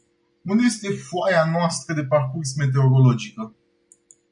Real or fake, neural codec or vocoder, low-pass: real; none; 9.9 kHz